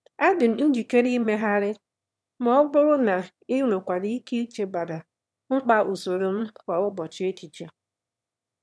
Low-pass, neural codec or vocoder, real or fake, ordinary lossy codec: none; autoencoder, 22.05 kHz, a latent of 192 numbers a frame, VITS, trained on one speaker; fake; none